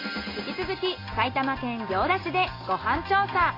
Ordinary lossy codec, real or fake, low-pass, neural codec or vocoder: AAC, 24 kbps; real; 5.4 kHz; none